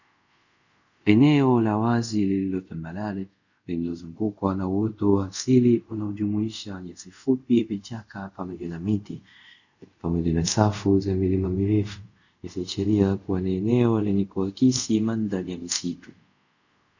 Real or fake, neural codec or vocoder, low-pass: fake; codec, 24 kHz, 0.5 kbps, DualCodec; 7.2 kHz